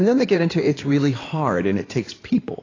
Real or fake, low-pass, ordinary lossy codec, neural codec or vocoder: fake; 7.2 kHz; AAC, 32 kbps; codec, 16 kHz in and 24 kHz out, 2.2 kbps, FireRedTTS-2 codec